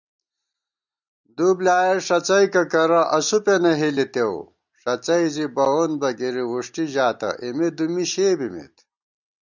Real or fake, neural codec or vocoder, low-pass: real; none; 7.2 kHz